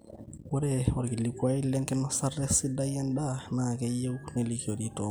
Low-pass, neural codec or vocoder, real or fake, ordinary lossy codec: none; none; real; none